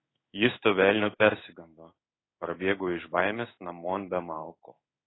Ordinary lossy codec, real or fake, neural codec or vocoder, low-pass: AAC, 16 kbps; fake; codec, 16 kHz in and 24 kHz out, 1 kbps, XY-Tokenizer; 7.2 kHz